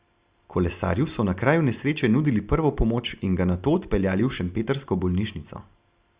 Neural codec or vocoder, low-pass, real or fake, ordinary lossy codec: none; 3.6 kHz; real; Opus, 64 kbps